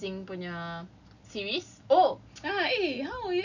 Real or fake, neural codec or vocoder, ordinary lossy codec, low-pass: real; none; none; 7.2 kHz